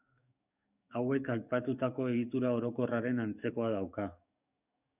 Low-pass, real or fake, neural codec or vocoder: 3.6 kHz; fake; codec, 44.1 kHz, 7.8 kbps, DAC